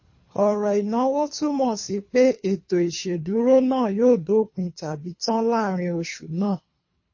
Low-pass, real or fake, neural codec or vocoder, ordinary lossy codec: 7.2 kHz; fake; codec, 24 kHz, 3 kbps, HILCodec; MP3, 32 kbps